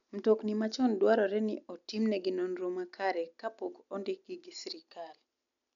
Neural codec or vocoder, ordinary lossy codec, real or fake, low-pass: none; none; real; 7.2 kHz